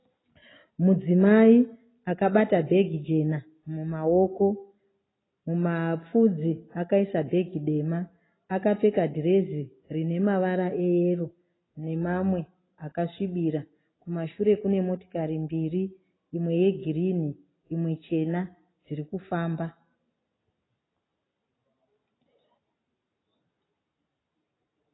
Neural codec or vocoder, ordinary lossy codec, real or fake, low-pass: none; AAC, 16 kbps; real; 7.2 kHz